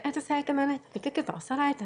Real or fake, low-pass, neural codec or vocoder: fake; 9.9 kHz; autoencoder, 22.05 kHz, a latent of 192 numbers a frame, VITS, trained on one speaker